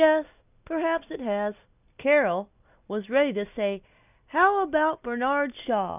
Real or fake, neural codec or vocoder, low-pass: real; none; 3.6 kHz